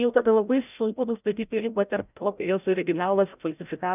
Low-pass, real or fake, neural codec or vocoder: 3.6 kHz; fake; codec, 16 kHz, 0.5 kbps, FreqCodec, larger model